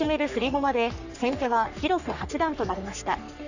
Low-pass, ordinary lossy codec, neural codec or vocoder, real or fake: 7.2 kHz; none; codec, 44.1 kHz, 3.4 kbps, Pupu-Codec; fake